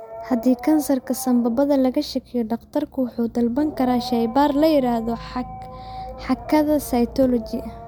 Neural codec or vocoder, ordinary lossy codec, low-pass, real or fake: none; MP3, 96 kbps; 19.8 kHz; real